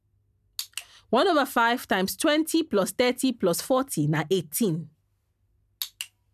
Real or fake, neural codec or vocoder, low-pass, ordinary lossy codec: real; none; 14.4 kHz; none